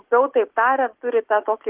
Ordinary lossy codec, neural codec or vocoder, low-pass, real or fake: Opus, 16 kbps; none; 3.6 kHz; real